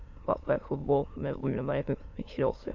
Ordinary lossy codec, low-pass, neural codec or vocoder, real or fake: MP3, 48 kbps; 7.2 kHz; autoencoder, 22.05 kHz, a latent of 192 numbers a frame, VITS, trained on many speakers; fake